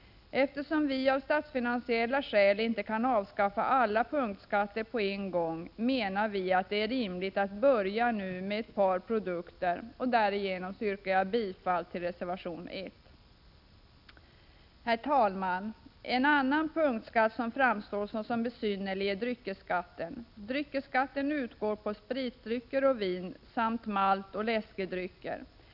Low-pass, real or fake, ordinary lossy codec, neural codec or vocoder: 5.4 kHz; real; none; none